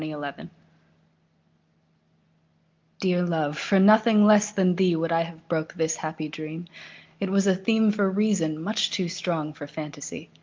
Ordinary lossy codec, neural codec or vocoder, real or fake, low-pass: Opus, 32 kbps; none; real; 7.2 kHz